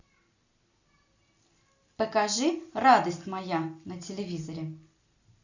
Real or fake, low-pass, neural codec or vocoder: real; 7.2 kHz; none